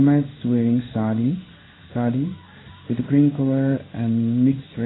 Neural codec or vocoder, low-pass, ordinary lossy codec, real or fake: codec, 16 kHz in and 24 kHz out, 1 kbps, XY-Tokenizer; 7.2 kHz; AAC, 16 kbps; fake